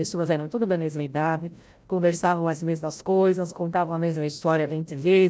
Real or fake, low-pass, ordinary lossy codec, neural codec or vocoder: fake; none; none; codec, 16 kHz, 0.5 kbps, FreqCodec, larger model